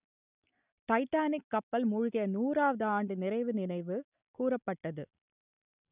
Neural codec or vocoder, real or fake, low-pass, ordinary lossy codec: none; real; 3.6 kHz; none